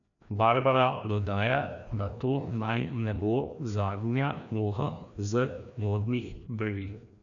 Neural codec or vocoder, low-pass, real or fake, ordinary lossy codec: codec, 16 kHz, 1 kbps, FreqCodec, larger model; 7.2 kHz; fake; none